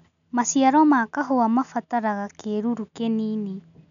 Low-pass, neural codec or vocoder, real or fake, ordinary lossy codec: 7.2 kHz; none; real; none